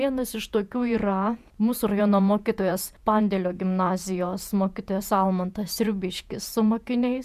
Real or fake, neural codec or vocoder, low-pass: fake; vocoder, 48 kHz, 128 mel bands, Vocos; 14.4 kHz